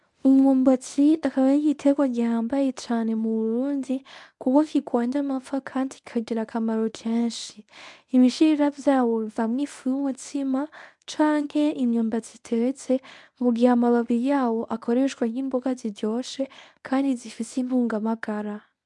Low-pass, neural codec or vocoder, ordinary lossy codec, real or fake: 10.8 kHz; codec, 24 kHz, 0.9 kbps, WavTokenizer, medium speech release version 1; MP3, 96 kbps; fake